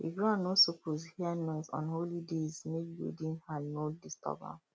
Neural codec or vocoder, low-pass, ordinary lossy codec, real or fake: none; none; none; real